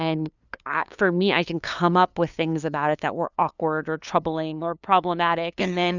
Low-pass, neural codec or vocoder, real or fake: 7.2 kHz; codec, 16 kHz, 2 kbps, FunCodec, trained on LibriTTS, 25 frames a second; fake